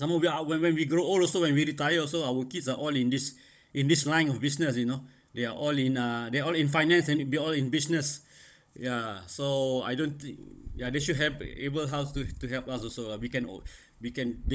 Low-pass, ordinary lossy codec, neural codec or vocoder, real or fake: none; none; codec, 16 kHz, 16 kbps, FunCodec, trained on Chinese and English, 50 frames a second; fake